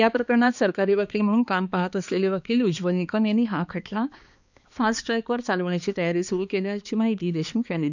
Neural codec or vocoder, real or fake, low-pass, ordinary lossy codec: codec, 16 kHz, 2 kbps, X-Codec, HuBERT features, trained on balanced general audio; fake; 7.2 kHz; none